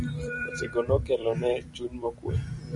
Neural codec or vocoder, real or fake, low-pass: vocoder, 44.1 kHz, 128 mel bands every 256 samples, BigVGAN v2; fake; 10.8 kHz